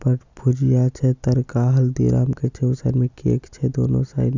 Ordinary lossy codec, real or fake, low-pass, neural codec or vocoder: Opus, 64 kbps; real; 7.2 kHz; none